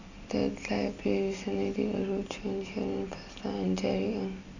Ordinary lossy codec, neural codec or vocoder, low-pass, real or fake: none; none; 7.2 kHz; real